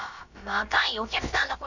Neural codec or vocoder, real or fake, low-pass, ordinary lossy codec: codec, 16 kHz, about 1 kbps, DyCAST, with the encoder's durations; fake; 7.2 kHz; none